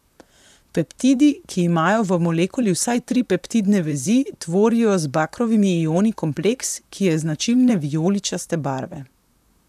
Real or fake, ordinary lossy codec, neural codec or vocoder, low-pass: fake; none; vocoder, 44.1 kHz, 128 mel bands, Pupu-Vocoder; 14.4 kHz